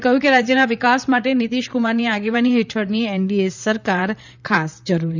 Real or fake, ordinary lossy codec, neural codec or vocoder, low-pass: fake; none; vocoder, 44.1 kHz, 128 mel bands, Pupu-Vocoder; 7.2 kHz